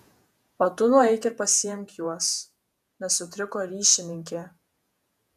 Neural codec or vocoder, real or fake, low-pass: vocoder, 48 kHz, 128 mel bands, Vocos; fake; 14.4 kHz